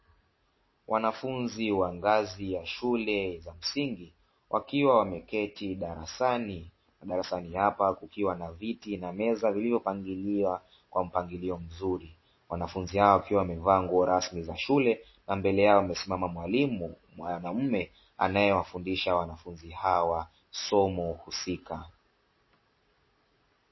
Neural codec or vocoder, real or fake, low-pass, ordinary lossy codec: none; real; 7.2 kHz; MP3, 24 kbps